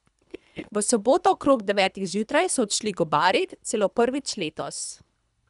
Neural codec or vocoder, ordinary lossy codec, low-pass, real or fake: codec, 24 kHz, 3 kbps, HILCodec; none; 10.8 kHz; fake